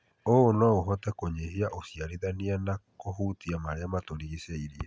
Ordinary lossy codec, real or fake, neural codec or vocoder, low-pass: none; real; none; none